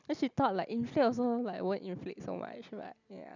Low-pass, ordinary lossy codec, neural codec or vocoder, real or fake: 7.2 kHz; none; none; real